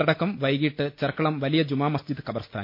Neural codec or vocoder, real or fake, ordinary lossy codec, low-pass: none; real; MP3, 32 kbps; 5.4 kHz